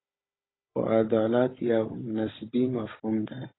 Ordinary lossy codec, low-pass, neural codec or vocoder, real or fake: AAC, 16 kbps; 7.2 kHz; codec, 16 kHz, 16 kbps, FunCodec, trained on Chinese and English, 50 frames a second; fake